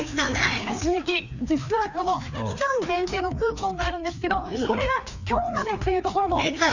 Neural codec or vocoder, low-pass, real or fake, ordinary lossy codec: codec, 16 kHz, 2 kbps, FreqCodec, larger model; 7.2 kHz; fake; none